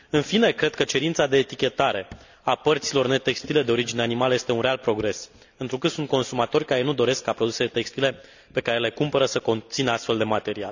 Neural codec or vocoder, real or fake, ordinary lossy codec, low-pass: none; real; none; 7.2 kHz